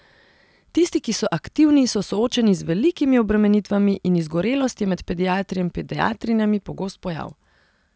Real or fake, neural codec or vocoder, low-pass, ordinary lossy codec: real; none; none; none